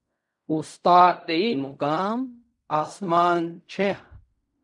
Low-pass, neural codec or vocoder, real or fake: 10.8 kHz; codec, 16 kHz in and 24 kHz out, 0.4 kbps, LongCat-Audio-Codec, fine tuned four codebook decoder; fake